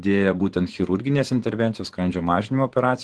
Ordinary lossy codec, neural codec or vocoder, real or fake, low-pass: Opus, 16 kbps; vocoder, 24 kHz, 100 mel bands, Vocos; fake; 10.8 kHz